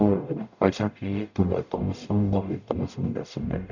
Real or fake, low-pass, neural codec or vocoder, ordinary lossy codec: fake; 7.2 kHz; codec, 44.1 kHz, 0.9 kbps, DAC; none